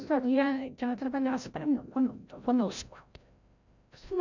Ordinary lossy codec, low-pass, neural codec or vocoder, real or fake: none; 7.2 kHz; codec, 16 kHz, 0.5 kbps, FreqCodec, larger model; fake